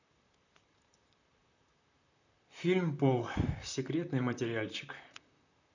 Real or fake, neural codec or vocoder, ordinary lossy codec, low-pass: fake; vocoder, 44.1 kHz, 128 mel bands, Pupu-Vocoder; none; 7.2 kHz